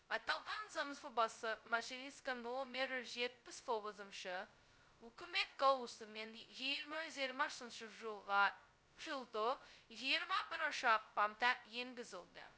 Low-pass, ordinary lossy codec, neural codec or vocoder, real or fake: none; none; codec, 16 kHz, 0.2 kbps, FocalCodec; fake